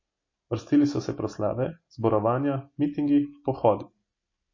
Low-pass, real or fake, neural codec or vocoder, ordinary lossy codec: 7.2 kHz; real; none; MP3, 32 kbps